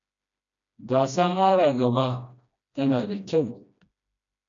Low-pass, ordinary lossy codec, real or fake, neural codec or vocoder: 7.2 kHz; MP3, 64 kbps; fake; codec, 16 kHz, 1 kbps, FreqCodec, smaller model